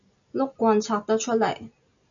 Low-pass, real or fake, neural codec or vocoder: 7.2 kHz; real; none